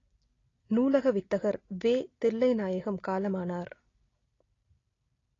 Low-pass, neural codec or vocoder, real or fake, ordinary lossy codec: 7.2 kHz; none; real; AAC, 32 kbps